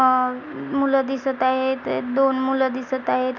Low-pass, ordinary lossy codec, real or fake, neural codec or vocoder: 7.2 kHz; none; real; none